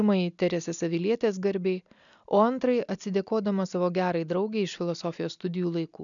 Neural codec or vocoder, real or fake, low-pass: none; real; 7.2 kHz